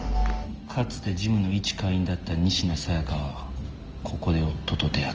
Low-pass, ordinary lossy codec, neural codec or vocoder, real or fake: 7.2 kHz; Opus, 24 kbps; none; real